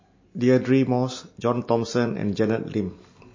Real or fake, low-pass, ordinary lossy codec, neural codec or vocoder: real; 7.2 kHz; MP3, 32 kbps; none